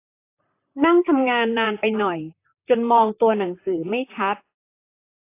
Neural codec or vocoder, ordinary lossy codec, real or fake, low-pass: vocoder, 44.1 kHz, 128 mel bands, Pupu-Vocoder; AAC, 24 kbps; fake; 3.6 kHz